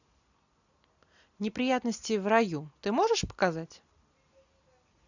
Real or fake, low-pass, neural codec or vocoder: real; 7.2 kHz; none